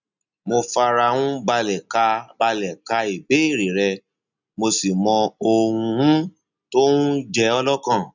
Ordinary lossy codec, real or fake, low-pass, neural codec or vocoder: none; real; 7.2 kHz; none